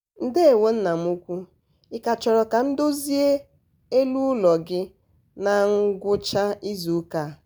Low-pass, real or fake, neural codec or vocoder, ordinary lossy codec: none; real; none; none